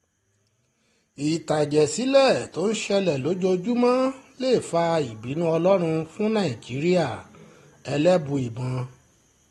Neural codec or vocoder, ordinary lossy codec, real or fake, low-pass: none; AAC, 32 kbps; real; 19.8 kHz